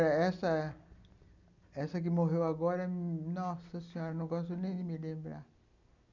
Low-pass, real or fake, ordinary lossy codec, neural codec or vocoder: 7.2 kHz; real; none; none